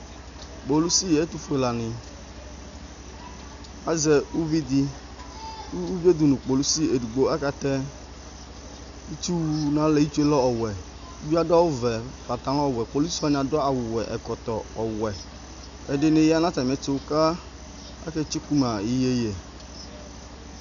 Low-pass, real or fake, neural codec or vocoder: 7.2 kHz; real; none